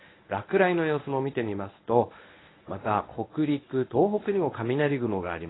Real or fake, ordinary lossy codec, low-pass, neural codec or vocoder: fake; AAC, 16 kbps; 7.2 kHz; codec, 24 kHz, 0.9 kbps, WavTokenizer, medium speech release version 1